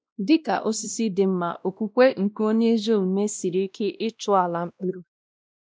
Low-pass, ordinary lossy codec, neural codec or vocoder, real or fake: none; none; codec, 16 kHz, 1 kbps, X-Codec, WavLM features, trained on Multilingual LibriSpeech; fake